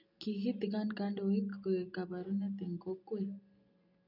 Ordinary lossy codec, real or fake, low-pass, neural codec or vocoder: none; real; 5.4 kHz; none